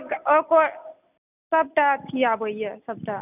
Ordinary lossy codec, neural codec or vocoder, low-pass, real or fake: none; none; 3.6 kHz; real